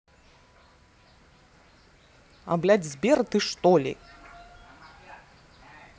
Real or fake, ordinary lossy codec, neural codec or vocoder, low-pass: real; none; none; none